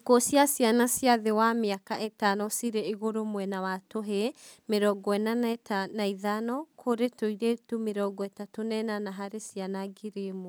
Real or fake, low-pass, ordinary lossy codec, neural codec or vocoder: real; none; none; none